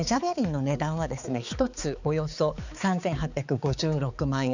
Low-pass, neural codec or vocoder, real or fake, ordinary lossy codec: 7.2 kHz; codec, 16 kHz, 4 kbps, X-Codec, HuBERT features, trained on balanced general audio; fake; none